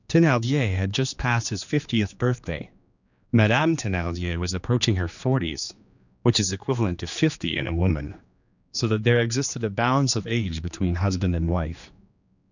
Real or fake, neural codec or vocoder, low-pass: fake; codec, 16 kHz, 2 kbps, X-Codec, HuBERT features, trained on general audio; 7.2 kHz